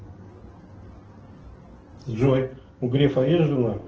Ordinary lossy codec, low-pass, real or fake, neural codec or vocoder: Opus, 16 kbps; 7.2 kHz; real; none